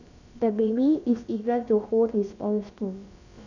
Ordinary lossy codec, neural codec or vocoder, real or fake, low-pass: none; codec, 16 kHz, about 1 kbps, DyCAST, with the encoder's durations; fake; 7.2 kHz